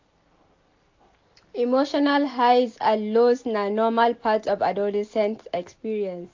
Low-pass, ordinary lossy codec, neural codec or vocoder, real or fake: 7.2 kHz; AAC, 48 kbps; none; real